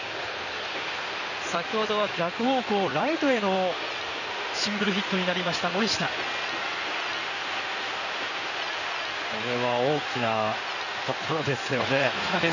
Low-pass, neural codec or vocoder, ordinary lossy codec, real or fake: 7.2 kHz; codec, 16 kHz in and 24 kHz out, 2.2 kbps, FireRedTTS-2 codec; none; fake